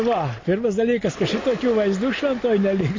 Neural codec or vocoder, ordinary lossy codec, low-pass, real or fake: none; MP3, 32 kbps; 7.2 kHz; real